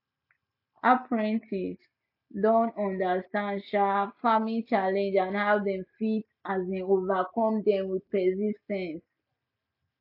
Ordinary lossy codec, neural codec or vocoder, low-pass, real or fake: none; none; 5.4 kHz; real